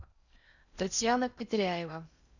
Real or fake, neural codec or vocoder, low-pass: fake; codec, 16 kHz in and 24 kHz out, 0.6 kbps, FocalCodec, streaming, 4096 codes; 7.2 kHz